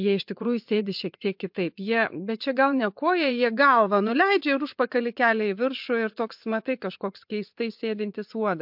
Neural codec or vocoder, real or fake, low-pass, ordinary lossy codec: vocoder, 24 kHz, 100 mel bands, Vocos; fake; 5.4 kHz; MP3, 48 kbps